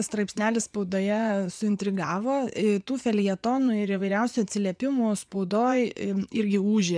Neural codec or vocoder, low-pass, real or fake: vocoder, 24 kHz, 100 mel bands, Vocos; 9.9 kHz; fake